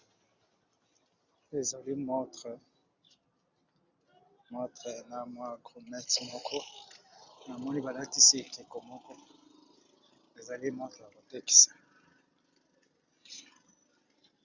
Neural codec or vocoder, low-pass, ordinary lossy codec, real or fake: none; 7.2 kHz; Opus, 64 kbps; real